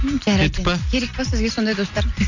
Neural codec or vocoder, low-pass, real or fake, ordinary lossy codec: none; 7.2 kHz; real; none